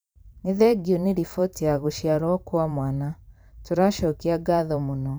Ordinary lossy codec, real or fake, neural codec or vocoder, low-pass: none; fake; vocoder, 44.1 kHz, 128 mel bands every 512 samples, BigVGAN v2; none